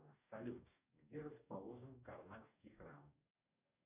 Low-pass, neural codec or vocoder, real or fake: 3.6 kHz; codec, 44.1 kHz, 2.6 kbps, DAC; fake